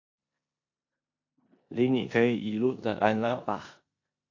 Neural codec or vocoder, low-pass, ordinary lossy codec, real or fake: codec, 16 kHz in and 24 kHz out, 0.9 kbps, LongCat-Audio-Codec, four codebook decoder; 7.2 kHz; AAC, 48 kbps; fake